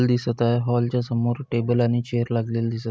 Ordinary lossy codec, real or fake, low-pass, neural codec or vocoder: none; real; none; none